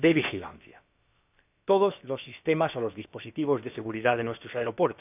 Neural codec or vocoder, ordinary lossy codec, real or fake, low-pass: codec, 16 kHz, about 1 kbps, DyCAST, with the encoder's durations; none; fake; 3.6 kHz